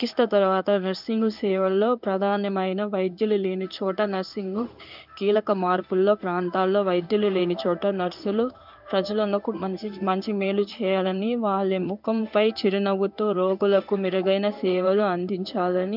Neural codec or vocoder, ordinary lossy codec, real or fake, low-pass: codec, 16 kHz in and 24 kHz out, 1 kbps, XY-Tokenizer; none; fake; 5.4 kHz